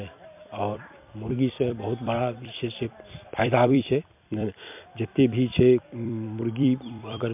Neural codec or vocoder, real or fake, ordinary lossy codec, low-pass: vocoder, 44.1 kHz, 128 mel bands every 256 samples, BigVGAN v2; fake; MP3, 32 kbps; 3.6 kHz